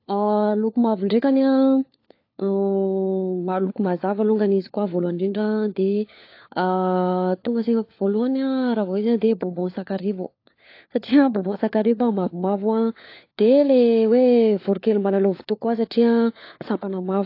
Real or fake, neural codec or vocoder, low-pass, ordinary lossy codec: fake; codec, 16 kHz, 4 kbps, FunCodec, trained on LibriTTS, 50 frames a second; 5.4 kHz; AAC, 32 kbps